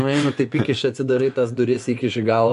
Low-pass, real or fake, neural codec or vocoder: 10.8 kHz; fake; vocoder, 24 kHz, 100 mel bands, Vocos